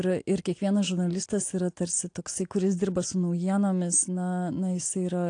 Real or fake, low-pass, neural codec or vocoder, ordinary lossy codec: real; 9.9 kHz; none; AAC, 48 kbps